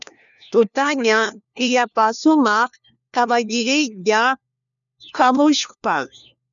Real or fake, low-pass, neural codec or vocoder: fake; 7.2 kHz; codec, 16 kHz, 1 kbps, FunCodec, trained on LibriTTS, 50 frames a second